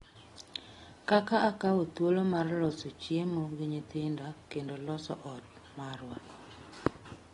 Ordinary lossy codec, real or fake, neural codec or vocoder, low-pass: AAC, 32 kbps; real; none; 10.8 kHz